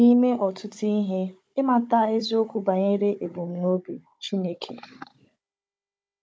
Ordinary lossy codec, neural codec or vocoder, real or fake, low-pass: none; codec, 16 kHz, 16 kbps, FunCodec, trained on Chinese and English, 50 frames a second; fake; none